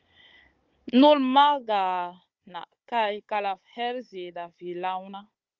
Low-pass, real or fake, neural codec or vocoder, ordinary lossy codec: 7.2 kHz; fake; codec, 16 kHz, 16 kbps, FunCodec, trained on Chinese and English, 50 frames a second; Opus, 32 kbps